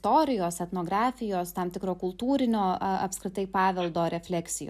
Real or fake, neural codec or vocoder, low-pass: real; none; 14.4 kHz